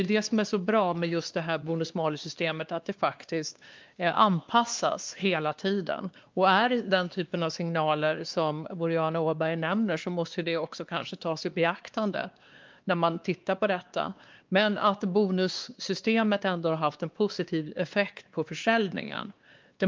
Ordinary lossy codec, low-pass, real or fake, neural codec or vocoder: Opus, 24 kbps; 7.2 kHz; fake; codec, 16 kHz, 2 kbps, X-Codec, WavLM features, trained on Multilingual LibriSpeech